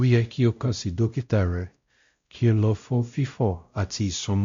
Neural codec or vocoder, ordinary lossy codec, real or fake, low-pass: codec, 16 kHz, 0.5 kbps, X-Codec, WavLM features, trained on Multilingual LibriSpeech; MP3, 64 kbps; fake; 7.2 kHz